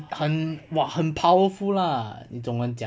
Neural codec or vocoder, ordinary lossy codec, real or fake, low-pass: none; none; real; none